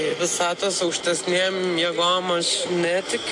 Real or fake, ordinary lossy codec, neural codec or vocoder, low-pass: real; AAC, 48 kbps; none; 10.8 kHz